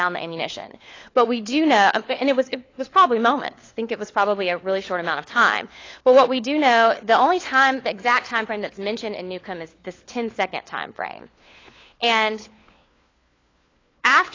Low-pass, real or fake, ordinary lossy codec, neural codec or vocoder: 7.2 kHz; fake; AAC, 32 kbps; codec, 16 kHz, 4 kbps, FunCodec, trained on LibriTTS, 50 frames a second